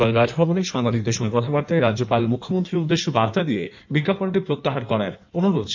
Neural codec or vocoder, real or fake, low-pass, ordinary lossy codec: codec, 16 kHz in and 24 kHz out, 1.1 kbps, FireRedTTS-2 codec; fake; 7.2 kHz; MP3, 64 kbps